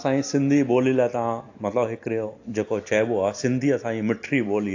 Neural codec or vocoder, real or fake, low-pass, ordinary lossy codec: none; real; 7.2 kHz; AAC, 48 kbps